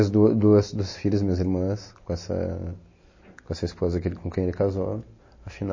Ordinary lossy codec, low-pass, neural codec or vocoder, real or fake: MP3, 32 kbps; 7.2 kHz; none; real